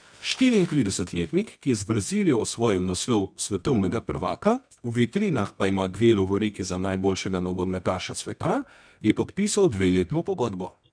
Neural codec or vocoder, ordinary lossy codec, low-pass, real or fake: codec, 24 kHz, 0.9 kbps, WavTokenizer, medium music audio release; none; 9.9 kHz; fake